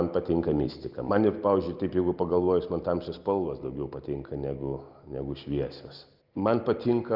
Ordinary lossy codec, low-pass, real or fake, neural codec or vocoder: Opus, 24 kbps; 5.4 kHz; real; none